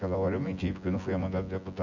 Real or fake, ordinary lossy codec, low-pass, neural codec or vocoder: fake; Opus, 64 kbps; 7.2 kHz; vocoder, 24 kHz, 100 mel bands, Vocos